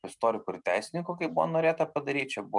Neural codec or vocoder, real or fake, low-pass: none; real; 10.8 kHz